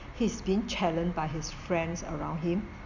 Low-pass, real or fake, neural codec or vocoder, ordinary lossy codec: 7.2 kHz; real; none; Opus, 64 kbps